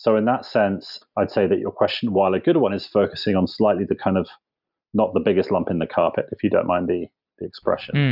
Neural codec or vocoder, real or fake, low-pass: none; real; 5.4 kHz